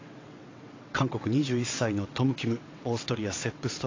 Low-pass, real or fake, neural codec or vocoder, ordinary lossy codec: 7.2 kHz; fake; vocoder, 22.05 kHz, 80 mel bands, WaveNeXt; AAC, 32 kbps